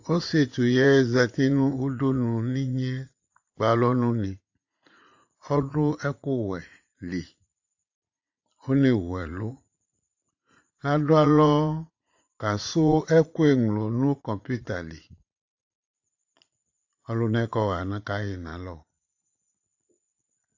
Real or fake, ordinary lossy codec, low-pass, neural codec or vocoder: fake; AAC, 48 kbps; 7.2 kHz; vocoder, 44.1 kHz, 80 mel bands, Vocos